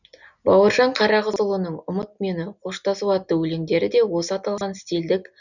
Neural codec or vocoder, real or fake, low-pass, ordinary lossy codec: none; real; 7.2 kHz; none